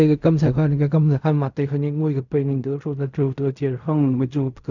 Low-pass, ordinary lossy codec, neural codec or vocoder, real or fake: 7.2 kHz; none; codec, 16 kHz in and 24 kHz out, 0.4 kbps, LongCat-Audio-Codec, fine tuned four codebook decoder; fake